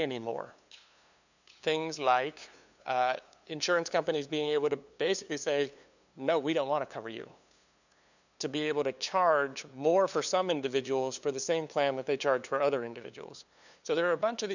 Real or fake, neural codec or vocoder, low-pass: fake; codec, 16 kHz, 2 kbps, FunCodec, trained on LibriTTS, 25 frames a second; 7.2 kHz